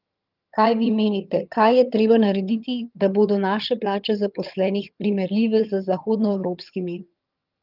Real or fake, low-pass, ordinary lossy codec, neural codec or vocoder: fake; 5.4 kHz; Opus, 32 kbps; vocoder, 22.05 kHz, 80 mel bands, HiFi-GAN